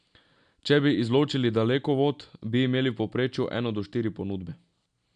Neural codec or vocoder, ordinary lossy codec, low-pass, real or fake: none; none; 9.9 kHz; real